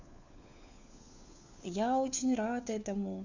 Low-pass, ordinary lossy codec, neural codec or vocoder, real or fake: 7.2 kHz; none; codec, 16 kHz, 4 kbps, FunCodec, trained on LibriTTS, 50 frames a second; fake